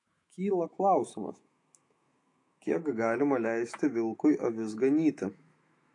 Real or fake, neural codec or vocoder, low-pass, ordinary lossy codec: fake; autoencoder, 48 kHz, 128 numbers a frame, DAC-VAE, trained on Japanese speech; 10.8 kHz; AAC, 48 kbps